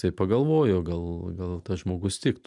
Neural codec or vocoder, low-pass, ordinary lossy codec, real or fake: none; 10.8 kHz; MP3, 96 kbps; real